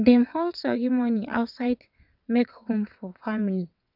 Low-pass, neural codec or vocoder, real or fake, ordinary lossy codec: 5.4 kHz; vocoder, 22.05 kHz, 80 mel bands, WaveNeXt; fake; none